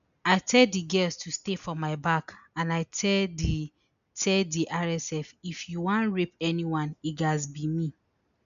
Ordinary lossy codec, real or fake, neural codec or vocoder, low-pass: none; real; none; 7.2 kHz